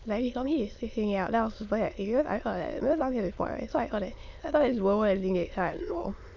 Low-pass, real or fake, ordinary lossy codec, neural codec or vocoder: 7.2 kHz; fake; none; autoencoder, 22.05 kHz, a latent of 192 numbers a frame, VITS, trained on many speakers